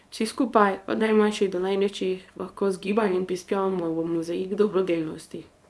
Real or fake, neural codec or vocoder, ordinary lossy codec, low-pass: fake; codec, 24 kHz, 0.9 kbps, WavTokenizer, small release; none; none